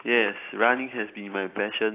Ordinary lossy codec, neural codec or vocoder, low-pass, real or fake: AAC, 24 kbps; none; 3.6 kHz; real